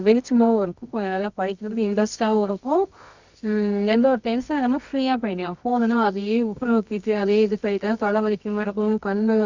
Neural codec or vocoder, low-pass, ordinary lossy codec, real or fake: codec, 24 kHz, 0.9 kbps, WavTokenizer, medium music audio release; 7.2 kHz; Opus, 64 kbps; fake